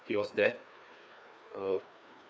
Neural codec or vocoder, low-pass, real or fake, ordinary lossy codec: codec, 16 kHz, 2 kbps, FreqCodec, larger model; none; fake; none